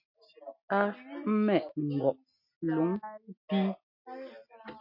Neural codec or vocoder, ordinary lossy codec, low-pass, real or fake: none; MP3, 32 kbps; 5.4 kHz; real